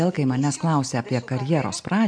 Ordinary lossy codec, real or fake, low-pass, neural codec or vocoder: MP3, 64 kbps; real; 9.9 kHz; none